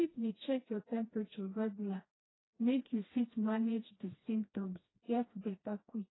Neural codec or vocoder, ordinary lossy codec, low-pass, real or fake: codec, 16 kHz, 1 kbps, FreqCodec, smaller model; AAC, 16 kbps; 7.2 kHz; fake